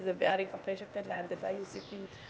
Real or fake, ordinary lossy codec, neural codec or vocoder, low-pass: fake; none; codec, 16 kHz, 0.8 kbps, ZipCodec; none